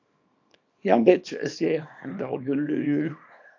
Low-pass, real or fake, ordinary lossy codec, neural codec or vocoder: 7.2 kHz; fake; AAC, 48 kbps; codec, 24 kHz, 0.9 kbps, WavTokenizer, small release